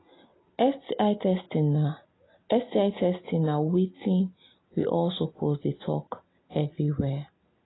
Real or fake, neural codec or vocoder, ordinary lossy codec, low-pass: real; none; AAC, 16 kbps; 7.2 kHz